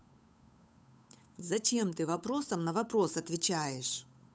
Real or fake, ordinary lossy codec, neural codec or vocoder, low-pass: fake; none; codec, 16 kHz, 8 kbps, FunCodec, trained on Chinese and English, 25 frames a second; none